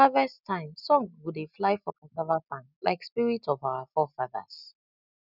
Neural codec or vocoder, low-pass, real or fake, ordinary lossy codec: none; 5.4 kHz; real; none